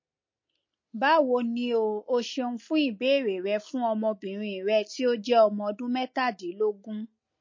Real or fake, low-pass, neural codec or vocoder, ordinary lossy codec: real; 7.2 kHz; none; MP3, 32 kbps